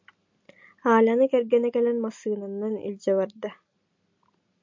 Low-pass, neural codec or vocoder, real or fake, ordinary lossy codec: 7.2 kHz; none; real; MP3, 48 kbps